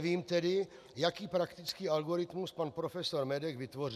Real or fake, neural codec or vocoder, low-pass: real; none; 14.4 kHz